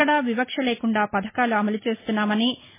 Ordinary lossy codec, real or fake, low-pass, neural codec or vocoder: MP3, 16 kbps; real; 3.6 kHz; none